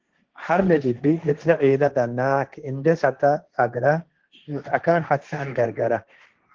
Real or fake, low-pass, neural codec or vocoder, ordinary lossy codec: fake; 7.2 kHz; codec, 16 kHz, 1.1 kbps, Voila-Tokenizer; Opus, 16 kbps